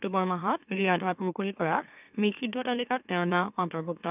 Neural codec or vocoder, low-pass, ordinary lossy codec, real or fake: autoencoder, 44.1 kHz, a latent of 192 numbers a frame, MeloTTS; 3.6 kHz; none; fake